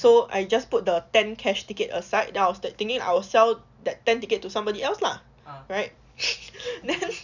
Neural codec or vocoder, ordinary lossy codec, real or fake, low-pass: none; none; real; 7.2 kHz